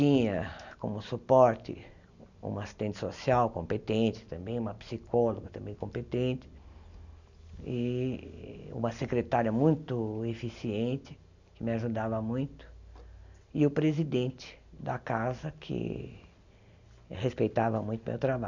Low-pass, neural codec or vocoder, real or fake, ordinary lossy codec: 7.2 kHz; none; real; none